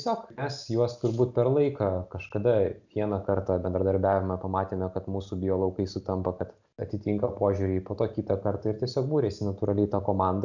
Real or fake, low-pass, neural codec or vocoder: real; 7.2 kHz; none